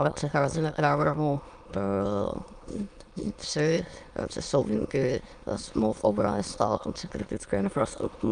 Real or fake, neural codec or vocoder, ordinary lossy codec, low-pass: fake; autoencoder, 22.05 kHz, a latent of 192 numbers a frame, VITS, trained on many speakers; Opus, 32 kbps; 9.9 kHz